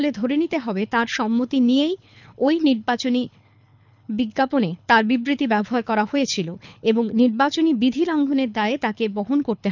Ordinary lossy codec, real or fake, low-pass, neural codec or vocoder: none; fake; 7.2 kHz; codec, 24 kHz, 6 kbps, HILCodec